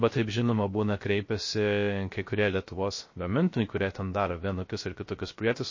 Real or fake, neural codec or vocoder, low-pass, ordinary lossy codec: fake; codec, 16 kHz, 0.3 kbps, FocalCodec; 7.2 kHz; MP3, 32 kbps